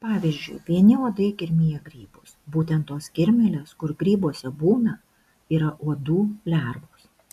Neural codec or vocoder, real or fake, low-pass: none; real; 14.4 kHz